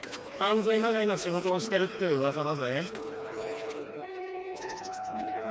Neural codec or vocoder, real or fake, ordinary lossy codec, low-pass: codec, 16 kHz, 2 kbps, FreqCodec, smaller model; fake; none; none